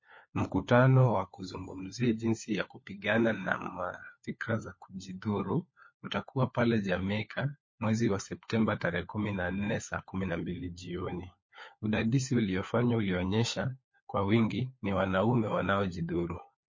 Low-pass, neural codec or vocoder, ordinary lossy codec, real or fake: 7.2 kHz; codec, 16 kHz, 4 kbps, FunCodec, trained on LibriTTS, 50 frames a second; MP3, 32 kbps; fake